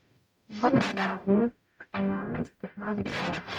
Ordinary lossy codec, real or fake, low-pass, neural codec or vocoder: none; fake; 19.8 kHz; codec, 44.1 kHz, 0.9 kbps, DAC